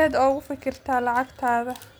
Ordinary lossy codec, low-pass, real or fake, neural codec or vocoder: none; none; real; none